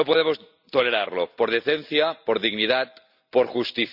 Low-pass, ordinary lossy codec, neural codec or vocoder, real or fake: 5.4 kHz; none; none; real